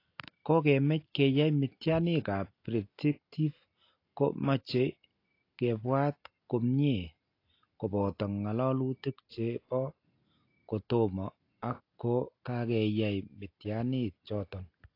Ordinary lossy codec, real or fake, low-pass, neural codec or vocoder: AAC, 32 kbps; real; 5.4 kHz; none